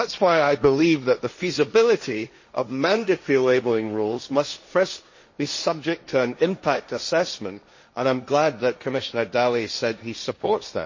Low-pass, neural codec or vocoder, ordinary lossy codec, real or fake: 7.2 kHz; codec, 16 kHz, 1.1 kbps, Voila-Tokenizer; MP3, 32 kbps; fake